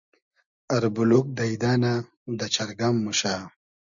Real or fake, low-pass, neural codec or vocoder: real; 7.2 kHz; none